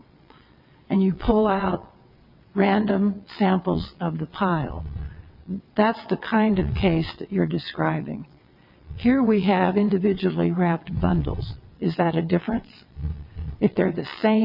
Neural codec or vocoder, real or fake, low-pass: vocoder, 22.05 kHz, 80 mel bands, WaveNeXt; fake; 5.4 kHz